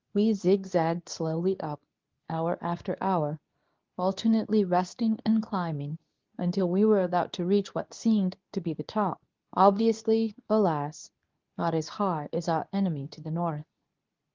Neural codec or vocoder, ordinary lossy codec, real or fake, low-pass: codec, 24 kHz, 0.9 kbps, WavTokenizer, medium speech release version 2; Opus, 32 kbps; fake; 7.2 kHz